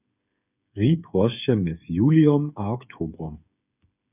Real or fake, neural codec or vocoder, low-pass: fake; codec, 16 kHz, 8 kbps, FreqCodec, smaller model; 3.6 kHz